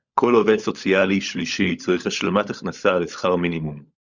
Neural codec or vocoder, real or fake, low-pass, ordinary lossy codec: codec, 16 kHz, 16 kbps, FunCodec, trained on LibriTTS, 50 frames a second; fake; 7.2 kHz; Opus, 64 kbps